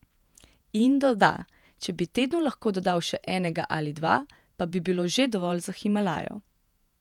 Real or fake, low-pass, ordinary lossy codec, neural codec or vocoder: fake; 19.8 kHz; none; vocoder, 48 kHz, 128 mel bands, Vocos